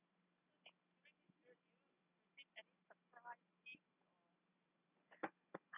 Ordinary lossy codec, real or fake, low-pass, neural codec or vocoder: none; real; 3.6 kHz; none